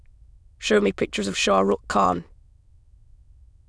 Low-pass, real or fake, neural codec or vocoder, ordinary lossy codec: none; fake; autoencoder, 22.05 kHz, a latent of 192 numbers a frame, VITS, trained on many speakers; none